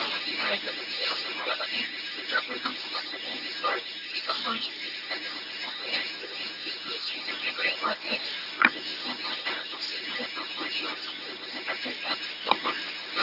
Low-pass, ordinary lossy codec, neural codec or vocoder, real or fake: 5.4 kHz; none; codec, 24 kHz, 0.9 kbps, WavTokenizer, medium speech release version 1; fake